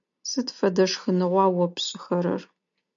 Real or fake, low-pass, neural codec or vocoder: real; 7.2 kHz; none